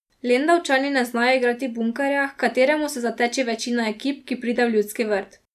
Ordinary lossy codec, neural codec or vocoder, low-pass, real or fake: none; none; 14.4 kHz; real